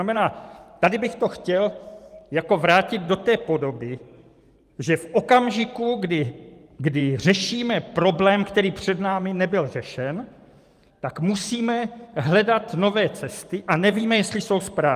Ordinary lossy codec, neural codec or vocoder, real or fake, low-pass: Opus, 32 kbps; vocoder, 44.1 kHz, 128 mel bands every 512 samples, BigVGAN v2; fake; 14.4 kHz